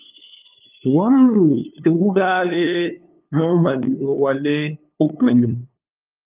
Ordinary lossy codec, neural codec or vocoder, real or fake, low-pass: Opus, 24 kbps; codec, 16 kHz, 2 kbps, FunCodec, trained on LibriTTS, 25 frames a second; fake; 3.6 kHz